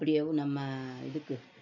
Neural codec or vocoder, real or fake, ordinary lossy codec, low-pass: none; real; none; 7.2 kHz